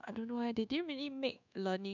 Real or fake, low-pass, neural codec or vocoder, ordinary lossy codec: fake; 7.2 kHz; codec, 24 kHz, 1.2 kbps, DualCodec; none